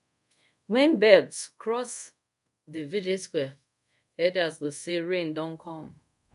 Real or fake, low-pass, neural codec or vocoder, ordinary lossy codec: fake; 10.8 kHz; codec, 24 kHz, 0.5 kbps, DualCodec; none